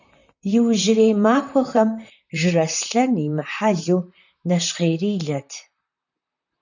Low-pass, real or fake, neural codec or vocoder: 7.2 kHz; fake; vocoder, 44.1 kHz, 128 mel bands, Pupu-Vocoder